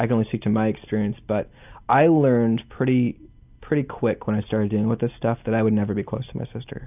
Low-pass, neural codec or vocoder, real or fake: 3.6 kHz; none; real